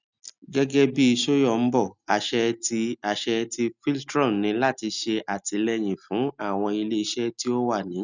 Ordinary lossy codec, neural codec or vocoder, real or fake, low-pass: none; none; real; 7.2 kHz